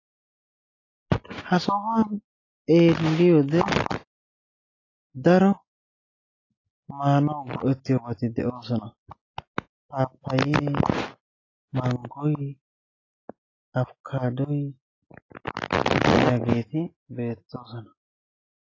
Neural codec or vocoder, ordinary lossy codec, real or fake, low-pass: none; AAC, 32 kbps; real; 7.2 kHz